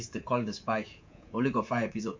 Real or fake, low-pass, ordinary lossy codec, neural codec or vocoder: fake; 7.2 kHz; MP3, 48 kbps; codec, 24 kHz, 3.1 kbps, DualCodec